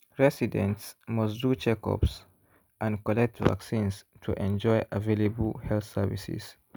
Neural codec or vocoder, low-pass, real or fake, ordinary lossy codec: none; none; real; none